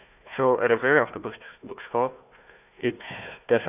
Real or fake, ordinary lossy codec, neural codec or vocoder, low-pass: fake; none; codec, 16 kHz, 1 kbps, FunCodec, trained on Chinese and English, 50 frames a second; 3.6 kHz